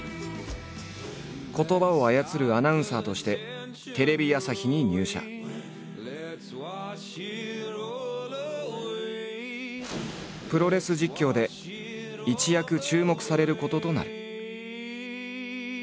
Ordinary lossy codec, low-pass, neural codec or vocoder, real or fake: none; none; none; real